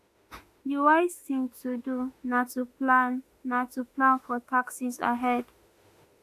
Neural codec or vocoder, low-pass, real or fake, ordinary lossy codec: autoencoder, 48 kHz, 32 numbers a frame, DAC-VAE, trained on Japanese speech; 14.4 kHz; fake; AAC, 64 kbps